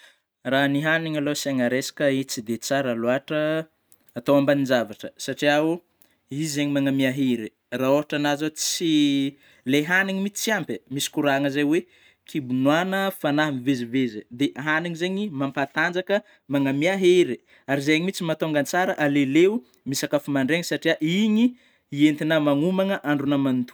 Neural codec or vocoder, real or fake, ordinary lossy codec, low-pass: none; real; none; none